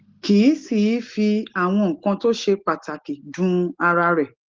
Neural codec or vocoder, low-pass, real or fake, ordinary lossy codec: none; 7.2 kHz; real; Opus, 16 kbps